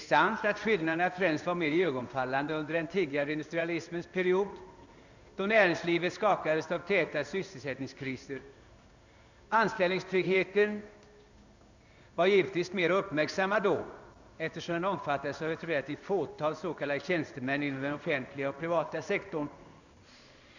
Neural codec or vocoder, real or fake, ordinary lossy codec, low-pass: codec, 16 kHz in and 24 kHz out, 1 kbps, XY-Tokenizer; fake; none; 7.2 kHz